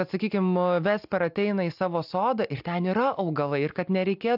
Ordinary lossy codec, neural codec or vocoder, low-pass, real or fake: AAC, 48 kbps; none; 5.4 kHz; real